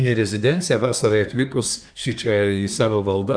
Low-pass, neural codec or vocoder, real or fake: 9.9 kHz; codec, 24 kHz, 1 kbps, SNAC; fake